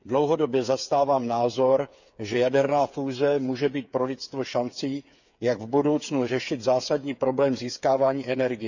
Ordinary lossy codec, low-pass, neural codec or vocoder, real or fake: none; 7.2 kHz; codec, 16 kHz, 8 kbps, FreqCodec, smaller model; fake